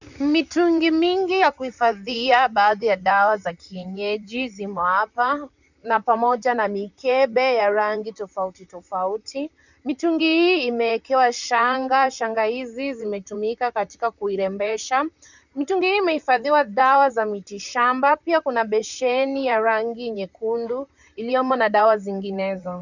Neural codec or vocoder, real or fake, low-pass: vocoder, 44.1 kHz, 128 mel bands, Pupu-Vocoder; fake; 7.2 kHz